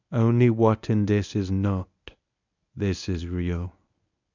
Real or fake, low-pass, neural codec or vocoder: fake; 7.2 kHz; codec, 24 kHz, 0.9 kbps, WavTokenizer, medium speech release version 1